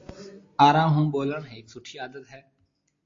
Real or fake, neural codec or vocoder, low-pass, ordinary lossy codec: real; none; 7.2 kHz; AAC, 48 kbps